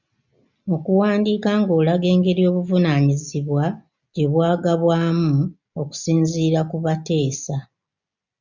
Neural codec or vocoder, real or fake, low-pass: none; real; 7.2 kHz